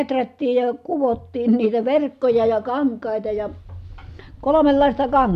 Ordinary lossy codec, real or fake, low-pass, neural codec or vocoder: MP3, 96 kbps; real; 14.4 kHz; none